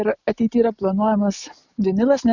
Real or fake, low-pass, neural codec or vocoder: real; 7.2 kHz; none